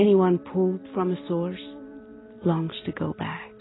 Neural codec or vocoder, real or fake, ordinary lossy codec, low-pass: none; real; AAC, 16 kbps; 7.2 kHz